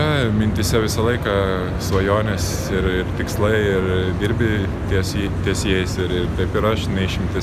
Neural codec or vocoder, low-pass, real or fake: none; 14.4 kHz; real